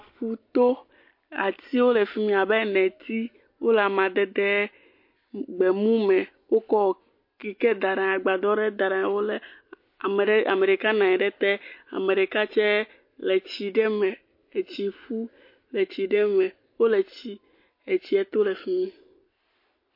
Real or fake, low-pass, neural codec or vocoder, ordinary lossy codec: real; 5.4 kHz; none; MP3, 32 kbps